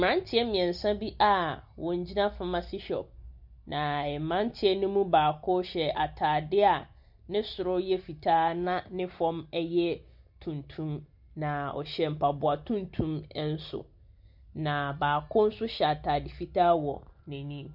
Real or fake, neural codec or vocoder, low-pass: real; none; 5.4 kHz